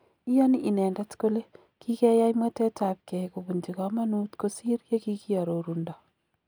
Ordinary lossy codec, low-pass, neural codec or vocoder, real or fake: none; none; none; real